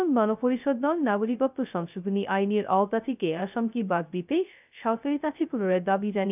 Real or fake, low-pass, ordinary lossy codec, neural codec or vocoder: fake; 3.6 kHz; none; codec, 16 kHz, 0.2 kbps, FocalCodec